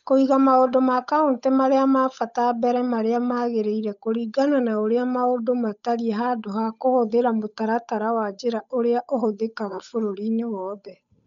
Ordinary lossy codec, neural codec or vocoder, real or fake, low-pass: none; codec, 16 kHz, 8 kbps, FunCodec, trained on Chinese and English, 25 frames a second; fake; 7.2 kHz